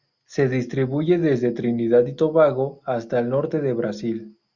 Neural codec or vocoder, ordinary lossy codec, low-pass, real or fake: none; Opus, 64 kbps; 7.2 kHz; real